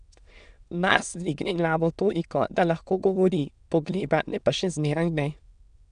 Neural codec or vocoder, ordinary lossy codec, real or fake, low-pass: autoencoder, 22.05 kHz, a latent of 192 numbers a frame, VITS, trained on many speakers; none; fake; 9.9 kHz